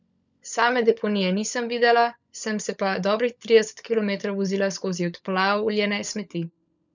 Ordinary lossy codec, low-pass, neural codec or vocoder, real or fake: none; 7.2 kHz; codec, 16 kHz, 16 kbps, FunCodec, trained on LibriTTS, 50 frames a second; fake